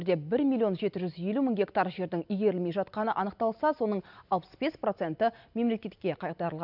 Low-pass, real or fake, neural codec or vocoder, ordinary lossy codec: 5.4 kHz; real; none; none